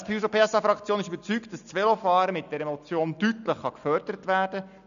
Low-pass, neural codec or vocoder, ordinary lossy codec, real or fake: 7.2 kHz; none; none; real